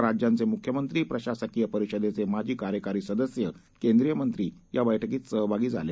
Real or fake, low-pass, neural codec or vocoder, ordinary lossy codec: real; none; none; none